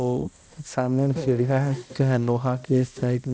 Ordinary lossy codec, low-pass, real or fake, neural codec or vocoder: none; none; fake; codec, 16 kHz, 1 kbps, X-Codec, HuBERT features, trained on balanced general audio